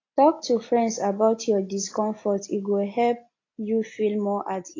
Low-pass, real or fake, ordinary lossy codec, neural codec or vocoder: 7.2 kHz; real; AAC, 32 kbps; none